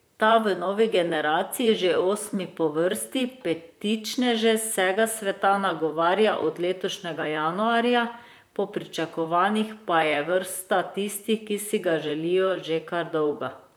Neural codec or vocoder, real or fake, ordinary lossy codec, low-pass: vocoder, 44.1 kHz, 128 mel bands, Pupu-Vocoder; fake; none; none